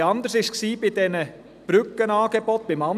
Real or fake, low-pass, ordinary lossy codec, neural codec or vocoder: real; 14.4 kHz; none; none